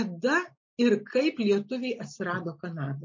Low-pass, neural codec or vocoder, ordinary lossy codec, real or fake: 7.2 kHz; none; MP3, 32 kbps; real